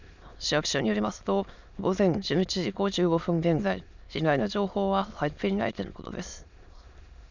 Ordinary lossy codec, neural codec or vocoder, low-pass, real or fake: none; autoencoder, 22.05 kHz, a latent of 192 numbers a frame, VITS, trained on many speakers; 7.2 kHz; fake